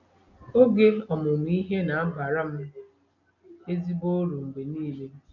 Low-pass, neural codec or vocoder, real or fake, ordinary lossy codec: 7.2 kHz; none; real; none